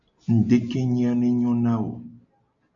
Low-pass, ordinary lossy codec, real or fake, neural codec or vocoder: 7.2 kHz; MP3, 48 kbps; real; none